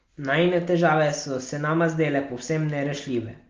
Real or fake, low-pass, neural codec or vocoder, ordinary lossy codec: real; 7.2 kHz; none; Opus, 32 kbps